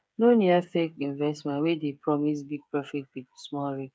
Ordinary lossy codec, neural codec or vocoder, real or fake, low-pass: none; codec, 16 kHz, 8 kbps, FreqCodec, smaller model; fake; none